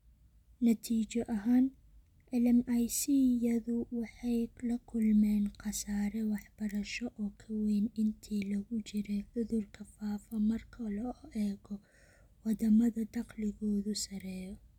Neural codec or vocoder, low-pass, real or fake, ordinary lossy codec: none; 19.8 kHz; real; none